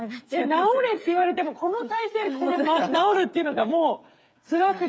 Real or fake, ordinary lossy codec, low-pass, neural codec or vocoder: fake; none; none; codec, 16 kHz, 8 kbps, FreqCodec, smaller model